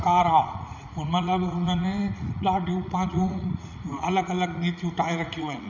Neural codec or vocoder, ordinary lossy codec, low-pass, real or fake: vocoder, 44.1 kHz, 80 mel bands, Vocos; none; 7.2 kHz; fake